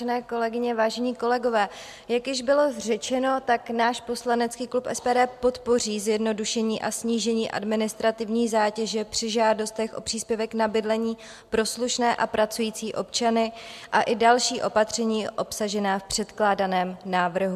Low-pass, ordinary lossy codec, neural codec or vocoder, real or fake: 14.4 kHz; MP3, 96 kbps; none; real